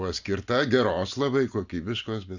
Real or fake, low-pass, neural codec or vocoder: real; 7.2 kHz; none